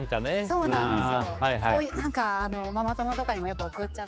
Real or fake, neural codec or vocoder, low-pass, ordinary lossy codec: fake; codec, 16 kHz, 4 kbps, X-Codec, HuBERT features, trained on general audio; none; none